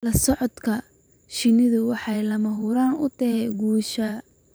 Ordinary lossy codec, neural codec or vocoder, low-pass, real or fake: none; vocoder, 44.1 kHz, 128 mel bands every 512 samples, BigVGAN v2; none; fake